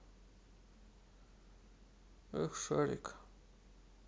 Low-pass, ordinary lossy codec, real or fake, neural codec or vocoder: none; none; real; none